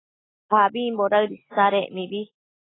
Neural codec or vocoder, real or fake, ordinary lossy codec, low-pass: none; real; AAC, 16 kbps; 7.2 kHz